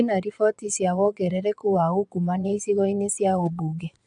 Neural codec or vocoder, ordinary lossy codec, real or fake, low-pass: vocoder, 22.05 kHz, 80 mel bands, Vocos; MP3, 96 kbps; fake; 9.9 kHz